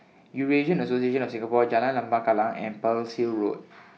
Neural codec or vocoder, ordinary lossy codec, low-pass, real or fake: none; none; none; real